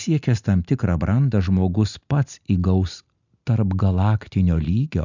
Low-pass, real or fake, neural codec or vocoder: 7.2 kHz; real; none